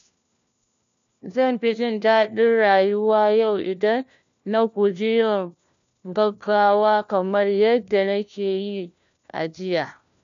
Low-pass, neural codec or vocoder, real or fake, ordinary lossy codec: 7.2 kHz; codec, 16 kHz, 1 kbps, FunCodec, trained on LibriTTS, 50 frames a second; fake; AAC, 96 kbps